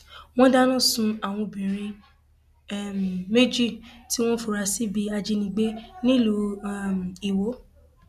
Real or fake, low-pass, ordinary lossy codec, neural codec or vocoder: real; 14.4 kHz; none; none